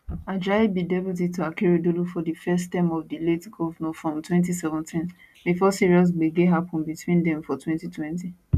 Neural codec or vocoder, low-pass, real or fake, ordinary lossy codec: none; 14.4 kHz; real; none